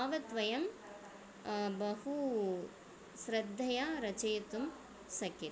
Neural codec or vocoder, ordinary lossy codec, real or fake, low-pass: none; none; real; none